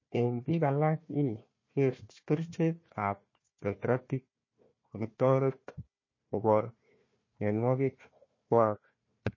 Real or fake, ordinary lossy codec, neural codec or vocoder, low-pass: fake; MP3, 32 kbps; codec, 16 kHz, 1 kbps, FunCodec, trained on Chinese and English, 50 frames a second; 7.2 kHz